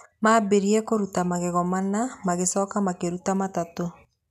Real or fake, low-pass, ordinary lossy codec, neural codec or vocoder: real; 14.4 kHz; none; none